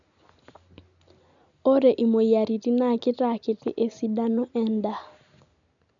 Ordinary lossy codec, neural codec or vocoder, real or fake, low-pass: none; none; real; 7.2 kHz